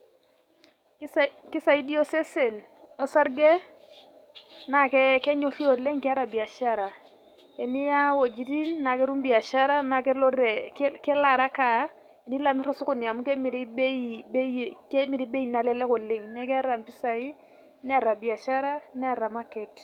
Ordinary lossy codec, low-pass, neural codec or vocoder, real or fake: none; 19.8 kHz; codec, 44.1 kHz, 7.8 kbps, DAC; fake